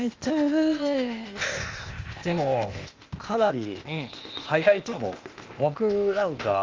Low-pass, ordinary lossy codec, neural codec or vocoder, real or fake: 7.2 kHz; Opus, 32 kbps; codec, 16 kHz, 0.8 kbps, ZipCodec; fake